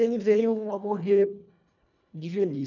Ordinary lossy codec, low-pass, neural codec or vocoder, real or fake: none; 7.2 kHz; codec, 24 kHz, 1.5 kbps, HILCodec; fake